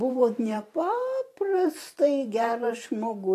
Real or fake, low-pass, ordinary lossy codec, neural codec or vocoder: fake; 14.4 kHz; AAC, 48 kbps; vocoder, 44.1 kHz, 128 mel bands every 512 samples, BigVGAN v2